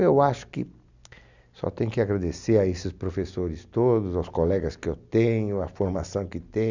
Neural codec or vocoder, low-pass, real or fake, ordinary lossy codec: none; 7.2 kHz; real; none